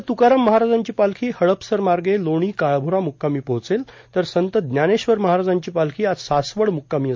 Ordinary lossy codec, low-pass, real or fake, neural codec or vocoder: MP3, 48 kbps; 7.2 kHz; real; none